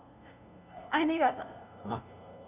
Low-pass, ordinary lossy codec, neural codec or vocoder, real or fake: 3.6 kHz; none; codec, 16 kHz, 0.5 kbps, FunCodec, trained on LibriTTS, 25 frames a second; fake